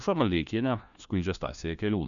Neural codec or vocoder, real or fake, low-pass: codec, 16 kHz, 1 kbps, FunCodec, trained on LibriTTS, 50 frames a second; fake; 7.2 kHz